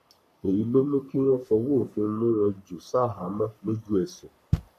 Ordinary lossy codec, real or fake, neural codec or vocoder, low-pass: none; fake; codec, 44.1 kHz, 3.4 kbps, Pupu-Codec; 14.4 kHz